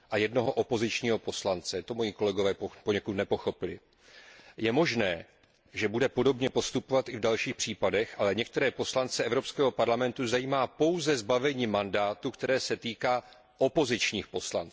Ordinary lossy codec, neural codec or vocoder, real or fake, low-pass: none; none; real; none